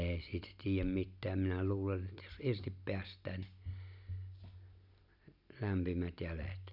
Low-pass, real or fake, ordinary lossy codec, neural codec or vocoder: 5.4 kHz; real; none; none